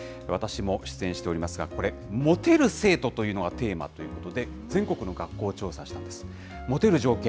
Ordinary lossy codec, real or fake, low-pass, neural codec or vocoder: none; real; none; none